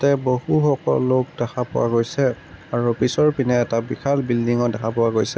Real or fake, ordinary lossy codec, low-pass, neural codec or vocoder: real; none; none; none